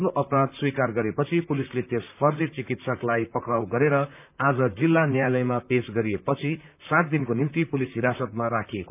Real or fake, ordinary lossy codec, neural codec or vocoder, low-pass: fake; none; vocoder, 44.1 kHz, 128 mel bands, Pupu-Vocoder; 3.6 kHz